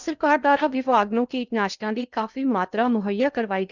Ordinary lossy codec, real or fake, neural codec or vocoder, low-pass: none; fake; codec, 16 kHz in and 24 kHz out, 0.8 kbps, FocalCodec, streaming, 65536 codes; 7.2 kHz